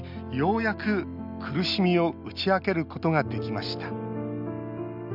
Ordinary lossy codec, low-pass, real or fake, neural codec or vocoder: none; 5.4 kHz; real; none